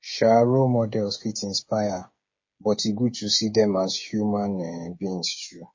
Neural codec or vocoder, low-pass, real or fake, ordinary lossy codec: codec, 16 kHz, 8 kbps, FreqCodec, smaller model; 7.2 kHz; fake; MP3, 32 kbps